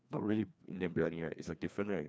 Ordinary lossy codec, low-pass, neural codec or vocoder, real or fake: none; none; codec, 16 kHz, 2 kbps, FreqCodec, larger model; fake